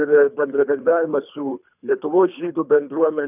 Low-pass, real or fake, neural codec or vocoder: 3.6 kHz; fake; codec, 24 kHz, 3 kbps, HILCodec